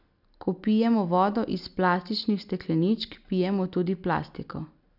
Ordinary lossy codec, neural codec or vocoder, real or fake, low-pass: none; none; real; 5.4 kHz